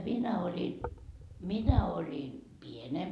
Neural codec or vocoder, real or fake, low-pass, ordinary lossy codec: none; real; none; none